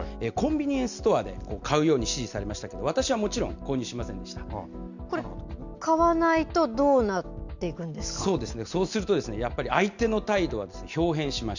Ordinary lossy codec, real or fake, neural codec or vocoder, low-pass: none; real; none; 7.2 kHz